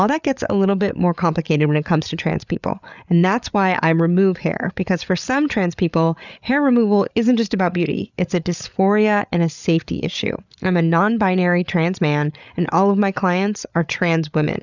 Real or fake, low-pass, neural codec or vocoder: fake; 7.2 kHz; codec, 16 kHz, 8 kbps, FreqCodec, larger model